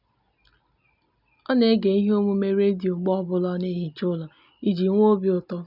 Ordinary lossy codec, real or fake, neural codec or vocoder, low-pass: none; real; none; 5.4 kHz